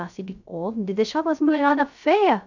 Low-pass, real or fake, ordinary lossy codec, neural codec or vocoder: 7.2 kHz; fake; none; codec, 16 kHz, 0.3 kbps, FocalCodec